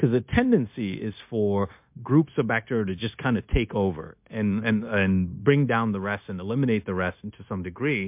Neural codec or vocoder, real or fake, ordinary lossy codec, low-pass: codec, 16 kHz, 0.9 kbps, LongCat-Audio-Codec; fake; MP3, 32 kbps; 3.6 kHz